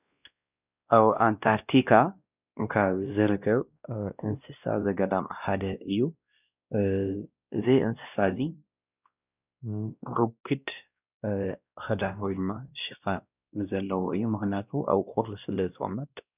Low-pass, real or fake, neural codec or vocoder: 3.6 kHz; fake; codec, 16 kHz, 1 kbps, X-Codec, WavLM features, trained on Multilingual LibriSpeech